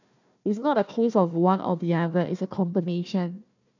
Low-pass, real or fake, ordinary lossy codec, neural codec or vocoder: 7.2 kHz; fake; none; codec, 16 kHz, 1 kbps, FunCodec, trained on Chinese and English, 50 frames a second